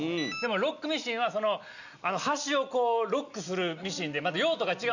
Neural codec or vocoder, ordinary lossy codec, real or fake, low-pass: none; none; real; 7.2 kHz